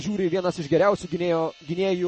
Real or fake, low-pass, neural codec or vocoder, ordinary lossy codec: real; 10.8 kHz; none; MP3, 32 kbps